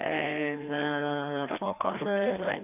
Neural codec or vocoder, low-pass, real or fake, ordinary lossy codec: codec, 16 kHz, 2 kbps, FreqCodec, larger model; 3.6 kHz; fake; none